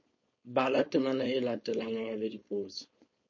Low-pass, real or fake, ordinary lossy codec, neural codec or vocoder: 7.2 kHz; fake; MP3, 32 kbps; codec, 16 kHz, 4.8 kbps, FACodec